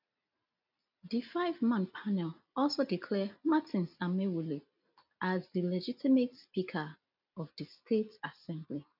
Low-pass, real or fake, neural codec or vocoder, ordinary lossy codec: 5.4 kHz; real; none; none